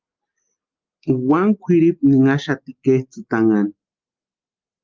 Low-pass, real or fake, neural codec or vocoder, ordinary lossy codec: 7.2 kHz; real; none; Opus, 32 kbps